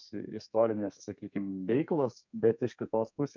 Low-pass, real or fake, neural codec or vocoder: 7.2 kHz; fake; codec, 32 kHz, 1.9 kbps, SNAC